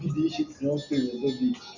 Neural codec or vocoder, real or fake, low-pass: none; real; 7.2 kHz